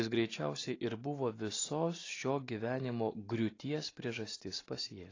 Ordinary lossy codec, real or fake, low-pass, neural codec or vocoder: AAC, 32 kbps; real; 7.2 kHz; none